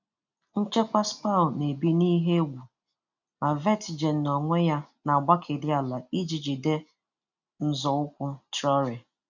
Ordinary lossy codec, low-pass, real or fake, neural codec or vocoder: none; 7.2 kHz; real; none